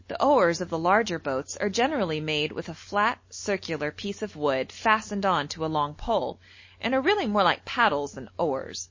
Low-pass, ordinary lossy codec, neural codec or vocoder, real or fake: 7.2 kHz; MP3, 32 kbps; none; real